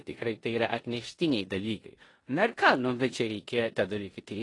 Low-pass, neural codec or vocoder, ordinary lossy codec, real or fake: 10.8 kHz; codec, 16 kHz in and 24 kHz out, 0.9 kbps, LongCat-Audio-Codec, four codebook decoder; AAC, 32 kbps; fake